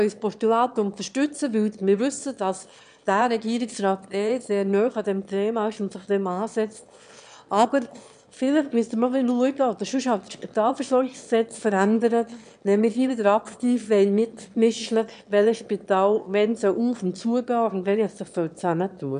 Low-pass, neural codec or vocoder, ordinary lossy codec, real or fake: 9.9 kHz; autoencoder, 22.05 kHz, a latent of 192 numbers a frame, VITS, trained on one speaker; none; fake